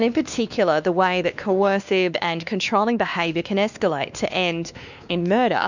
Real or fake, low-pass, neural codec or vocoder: fake; 7.2 kHz; codec, 16 kHz, 2 kbps, X-Codec, WavLM features, trained on Multilingual LibriSpeech